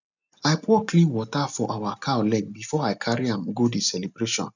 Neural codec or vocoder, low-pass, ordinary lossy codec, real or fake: none; 7.2 kHz; none; real